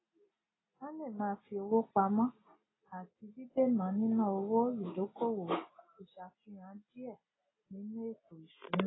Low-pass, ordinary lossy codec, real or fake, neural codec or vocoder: 7.2 kHz; AAC, 16 kbps; real; none